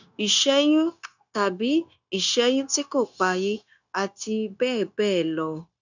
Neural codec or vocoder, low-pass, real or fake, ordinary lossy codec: codec, 16 kHz, 0.9 kbps, LongCat-Audio-Codec; 7.2 kHz; fake; none